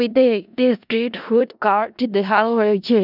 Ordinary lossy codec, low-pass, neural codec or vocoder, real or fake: none; 5.4 kHz; codec, 16 kHz in and 24 kHz out, 0.4 kbps, LongCat-Audio-Codec, four codebook decoder; fake